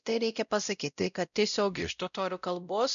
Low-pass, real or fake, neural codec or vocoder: 7.2 kHz; fake; codec, 16 kHz, 0.5 kbps, X-Codec, WavLM features, trained on Multilingual LibriSpeech